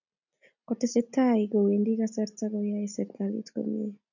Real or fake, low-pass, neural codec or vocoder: real; 7.2 kHz; none